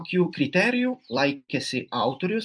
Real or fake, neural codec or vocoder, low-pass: fake; vocoder, 44.1 kHz, 128 mel bands every 256 samples, BigVGAN v2; 9.9 kHz